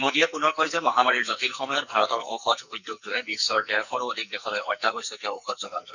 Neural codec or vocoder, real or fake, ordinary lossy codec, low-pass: codec, 44.1 kHz, 2.6 kbps, SNAC; fake; none; 7.2 kHz